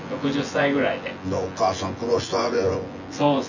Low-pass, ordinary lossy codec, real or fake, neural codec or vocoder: 7.2 kHz; none; fake; vocoder, 24 kHz, 100 mel bands, Vocos